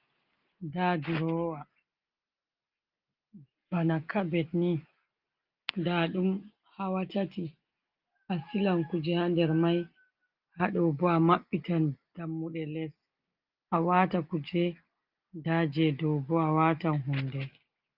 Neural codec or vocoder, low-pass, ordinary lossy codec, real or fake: none; 5.4 kHz; Opus, 24 kbps; real